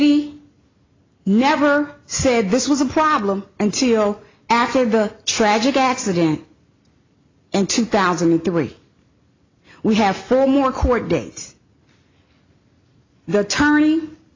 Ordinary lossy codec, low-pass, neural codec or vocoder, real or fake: AAC, 32 kbps; 7.2 kHz; none; real